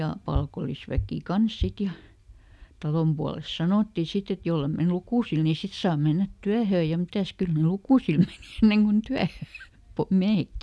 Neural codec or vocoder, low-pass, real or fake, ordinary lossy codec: none; none; real; none